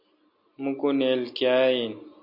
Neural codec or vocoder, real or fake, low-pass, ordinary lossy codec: none; real; 5.4 kHz; MP3, 32 kbps